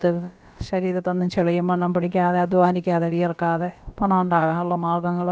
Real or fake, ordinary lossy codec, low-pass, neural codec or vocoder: fake; none; none; codec, 16 kHz, about 1 kbps, DyCAST, with the encoder's durations